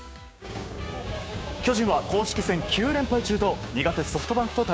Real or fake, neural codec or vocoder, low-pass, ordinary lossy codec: fake; codec, 16 kHz, 6 kbps, DAC; none; none